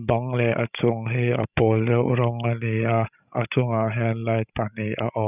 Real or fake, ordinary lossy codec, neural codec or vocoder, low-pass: fake; none; codec, 16 kHz, 16 kbps, FreqCodec, larger model; 3.6 kHz